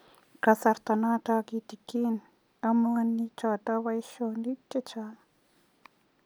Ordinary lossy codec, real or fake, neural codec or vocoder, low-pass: none; real; none; none